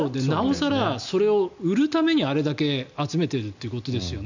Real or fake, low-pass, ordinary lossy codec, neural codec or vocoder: real; 7.2 kHz; none; none